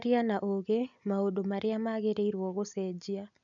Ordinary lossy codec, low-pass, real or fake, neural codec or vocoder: none; 7.2 kHz; real; none